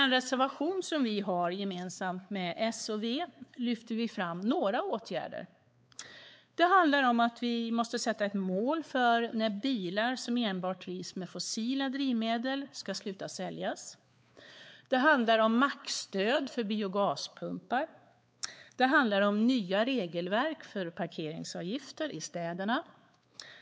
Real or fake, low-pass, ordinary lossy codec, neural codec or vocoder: fake; none; none; codec, 16 kHz, 4 kbps, X-Codec, WavLM features, trained on Multilingual LibriSpeech